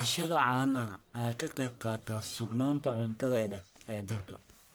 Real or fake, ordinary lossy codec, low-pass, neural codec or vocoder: fake; none; none; codec, 44.1 kHz, 1.7 kbps, Pupu-Codec